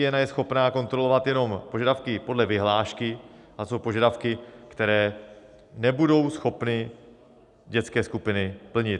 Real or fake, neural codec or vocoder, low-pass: real; none; 10.8 kHz